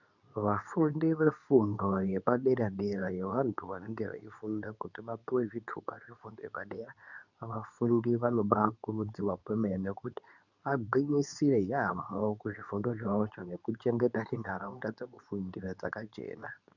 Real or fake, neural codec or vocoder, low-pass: fake; codec, 24 kHz, 0.9 kbps, WavTokenizer, medium speech release version 2; 7.2 kHz